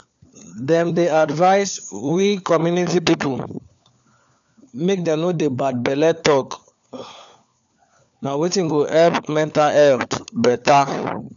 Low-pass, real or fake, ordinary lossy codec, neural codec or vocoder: 7.2 kHz; fake; none; codec, 16 kHz, 4 kbps, FunCodec, trained on LibriTTS, 50 frames a second